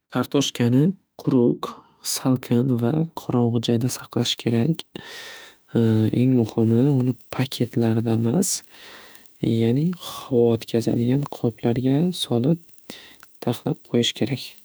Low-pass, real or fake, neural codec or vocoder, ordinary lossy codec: none; fake; autoencoder, 48 kHz, 32 numbers a frame, DAC-VAE, trained on Japanese speech; none